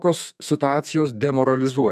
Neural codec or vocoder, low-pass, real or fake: codec, 32 kHz, 1.9 kbps, SNAC; 14.4 kHz; fake